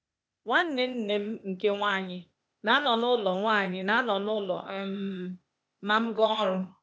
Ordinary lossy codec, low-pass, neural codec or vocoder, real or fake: none; none; codec, 16 kHz, 0.8 kbps, ZipCodec; fake